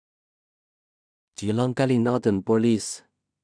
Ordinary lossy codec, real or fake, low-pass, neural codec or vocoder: none; fake; 9.9 kHz; codec, 16 kHz in and 24 kHz out, 0.4 kbps, LongCat-Audio-Codec, two codebook decoder